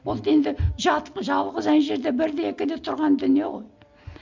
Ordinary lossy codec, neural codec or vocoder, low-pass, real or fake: MP3, 64 kbps; none; 7.2 kHz; real